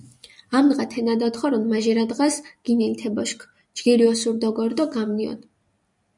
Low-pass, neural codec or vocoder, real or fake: 10.8 kHz; none; real